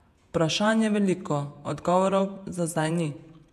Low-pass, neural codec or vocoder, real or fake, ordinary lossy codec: 14.4 kHz; vocoder, 48 kHz, 128 mel bands, Vocos; fake; AAC, 96 kbps